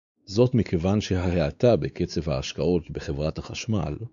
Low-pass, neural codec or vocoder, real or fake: 7.2 kHz; codec, 16 kHz, 4 kbps, X-Codec, WavLM features, trained on Multilingual LibriSpeech; fake